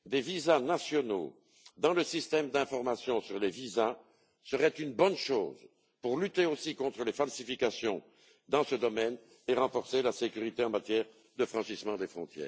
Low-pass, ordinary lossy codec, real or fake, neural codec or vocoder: none; none; real; none